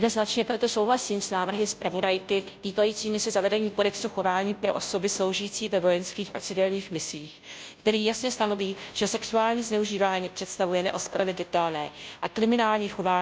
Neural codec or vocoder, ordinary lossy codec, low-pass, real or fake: codec, 16 kHz, 0.5 kbps, FunCodec, trained on Chinese and English, 25 frames a second; none; none; fake